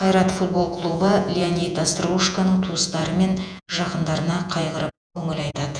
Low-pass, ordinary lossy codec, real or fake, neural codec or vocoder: 9.9 kHz; none; fake; vocoder, 48 kHz, 128 mel bands, Vocos